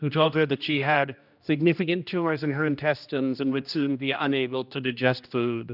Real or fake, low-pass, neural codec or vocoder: fake; 5.4 kHz; codec, 16 kHz, 1 kbps, X-Codec, HuBERT features, trained on general audio